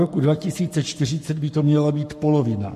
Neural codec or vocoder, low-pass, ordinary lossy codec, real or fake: codec, 44.1 kHz, 7.8 kbps, Pupu-Codec; 14.4 kHz; MP3, 64 kbps; fake